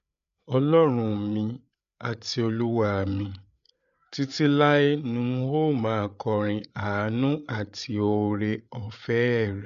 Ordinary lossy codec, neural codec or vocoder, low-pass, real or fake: none; codec, 16 kHz, 8 kbps, FreqCodec, larger model; 7.2 kHz; fake